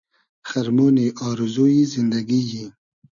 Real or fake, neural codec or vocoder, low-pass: real; none; 7.2 kHz